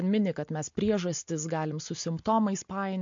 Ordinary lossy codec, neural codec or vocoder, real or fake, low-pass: MP3, 48 kbps; none; real; 7.2 kHz